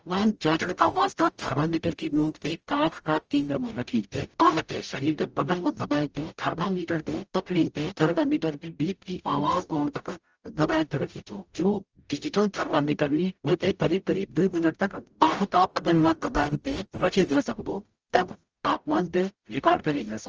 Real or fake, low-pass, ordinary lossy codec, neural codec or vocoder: fake; 7.2 kHz; Opus, 24 kbps; codec, 44.1 kHz, 0.9 kbps, DAC